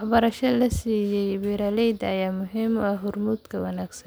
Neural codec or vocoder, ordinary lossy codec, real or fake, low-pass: none; none; real; none